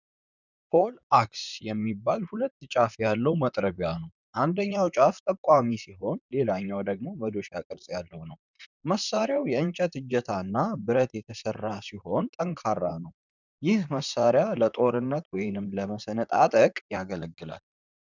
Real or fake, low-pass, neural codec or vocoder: fake; 7.2 kHz; vocoder, 44.1 kHz, 128 mel bands, Pupu-Vocoder